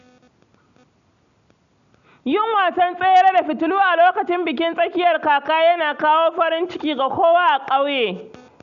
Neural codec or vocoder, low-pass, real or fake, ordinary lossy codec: none; 7.2 kHz; real; none